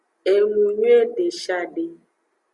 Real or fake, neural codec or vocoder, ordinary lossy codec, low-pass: real; none; Opus, 64 kbps; 10.8 kHz